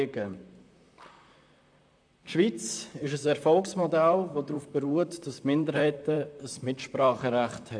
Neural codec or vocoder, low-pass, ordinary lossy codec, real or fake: vocoder, 44.1 kHz, 128 mel bands, Pupu-Vocoder; 9.9 kHz; AAC, 64 kbps; fake